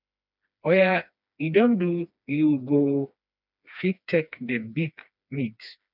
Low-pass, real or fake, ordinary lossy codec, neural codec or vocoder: 5.4 kHz; fake; none; codec, 16 kHz, 2 kbps, FreqCodec, smaller model